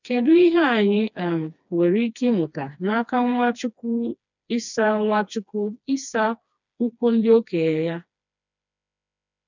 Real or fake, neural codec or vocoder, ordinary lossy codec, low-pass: fake; codec, 16 kHz, 2 kbps, FreqCodec, smaller model; none; 7.2 kHz